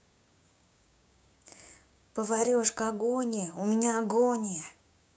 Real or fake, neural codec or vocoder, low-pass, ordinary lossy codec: fake; codec, 16 kHz, 6 kbps, DAC; none; none